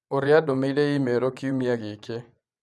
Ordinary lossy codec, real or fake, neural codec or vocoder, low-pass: none; fake; vocoder, 24 kHz, 100 mel bands, Vocos; none